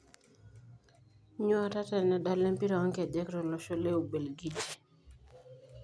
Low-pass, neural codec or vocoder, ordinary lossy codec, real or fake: none; none; none; real